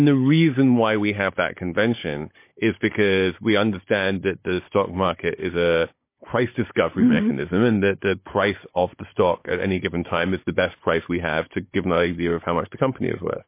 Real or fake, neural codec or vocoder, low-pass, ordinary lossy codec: fake; codec, 16 kHz, 16 kbps, FunCodec, trained on Chinese and English, 50 frames a second; 3.6 kHz; MP3, 24 kbps